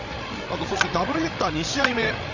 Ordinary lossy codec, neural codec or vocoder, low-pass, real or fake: none; codec, 16 kHz, 16 kbps, FreqCodec, larger model; 7.2 kHz; fake